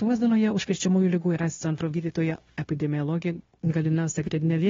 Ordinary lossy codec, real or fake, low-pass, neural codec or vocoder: AAC, 24 kbps; fake; 7.2 kHz; codec, 16 kHz, 0.9 kbps, LongCat-Audio-Codec